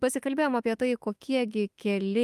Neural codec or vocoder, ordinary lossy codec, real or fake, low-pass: autoencoder, 48 kHz, 32 numbers a frame, DAC-VAE, trained on Japanese speech; Opus, 32 kbps; fake; 14.4 kHz